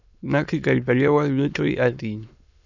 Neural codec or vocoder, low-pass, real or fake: autoencoder, 22.05 kHz, a latent of 192 numbers a frame, VITS, trained on many speakers; 7.2 kHz; fake